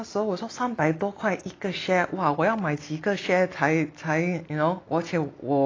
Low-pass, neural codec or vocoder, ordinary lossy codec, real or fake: 7.2 kHz; none; AAC, 32 kbps; real